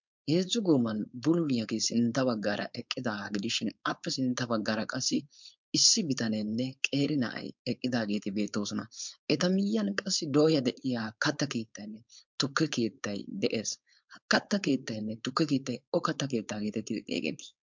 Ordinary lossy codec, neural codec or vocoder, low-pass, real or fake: MP3, 64 kbps; codec, 16 kHz, 4.8 kbps, FACodec; 7.2 kHz; fake